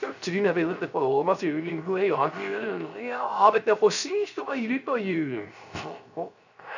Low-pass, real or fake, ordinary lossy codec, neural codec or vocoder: 7.2 kHz; fake; none; codec, 16 kHz, 0.3 kbps, FocalCodec